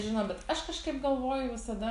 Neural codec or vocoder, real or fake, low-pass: none; real; 10.8 kHz